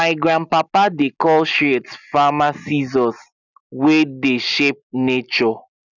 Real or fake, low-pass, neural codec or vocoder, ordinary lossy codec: real; 7.2 kHz; none; none